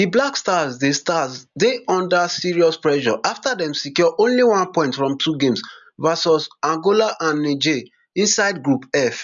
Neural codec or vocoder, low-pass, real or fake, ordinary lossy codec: none; 7.2 kHz; real; none